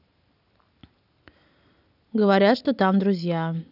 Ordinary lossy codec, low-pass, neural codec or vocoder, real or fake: none; 5.4 kHz; none; real